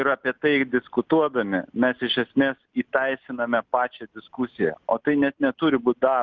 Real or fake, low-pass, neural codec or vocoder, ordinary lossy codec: real; 7.2 kHz; none; Opus, 32 kbps